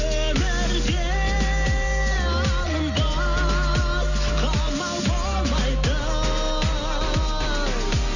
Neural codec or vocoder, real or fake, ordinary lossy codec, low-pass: none; real; none; 7.2 kHz